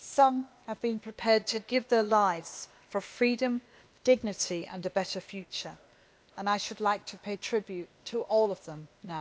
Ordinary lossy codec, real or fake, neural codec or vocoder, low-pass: none; fake; codec, 16 kHz, 0.8 kbps, ZipCodec; none